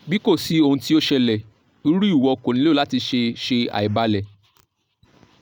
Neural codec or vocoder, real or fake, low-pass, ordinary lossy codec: none; real; 19.8 kHz; none